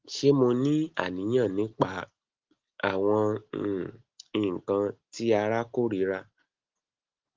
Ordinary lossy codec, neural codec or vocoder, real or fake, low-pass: Opus, 16 kbps; none; real; 7.2 kHz